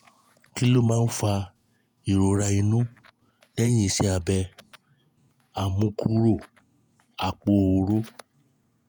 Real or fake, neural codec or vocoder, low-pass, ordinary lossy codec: fake; vocoder, 48 kHz, 128 mel bands, Vocos; none; none